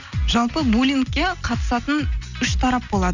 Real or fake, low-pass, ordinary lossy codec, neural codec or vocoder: real; 7.2 kHz; none; none